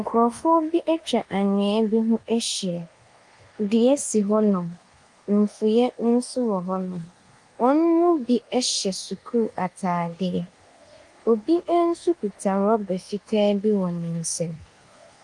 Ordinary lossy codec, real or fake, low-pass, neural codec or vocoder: Opus, 24 kbps; fake; 10.8 kHz; codec, 24 kHz, 1.2 kbps, DualCodec